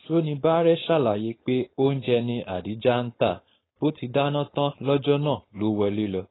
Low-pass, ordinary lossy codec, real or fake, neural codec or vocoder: 7.2 kHz; AAC, 16 kbps; fake; codec, 16 kHz, 4.8 kbps, FACodec